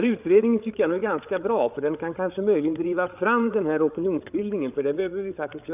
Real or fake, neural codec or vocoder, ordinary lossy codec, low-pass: fake; codec, 16 kHz, 8 kbps, FreqCodec, larger model; none; 3.6 kHz